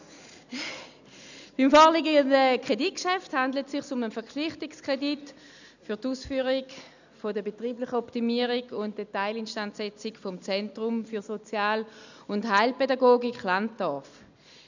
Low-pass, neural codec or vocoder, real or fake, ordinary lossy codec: 7.2 kHz; none; real; none